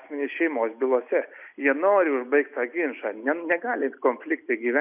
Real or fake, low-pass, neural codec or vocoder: real; 3.6 kHz; none